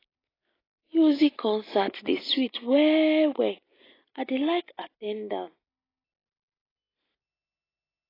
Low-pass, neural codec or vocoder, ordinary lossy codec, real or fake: 5.4 kHz; none; AAC, 24 kbps; real